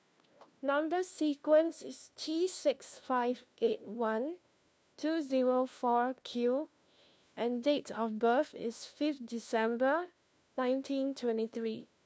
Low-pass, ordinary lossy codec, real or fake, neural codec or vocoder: none; none; fake; codec, 16 kHz, 1 kbps, FunCodec, trained on LibriTTS, 50 frames a second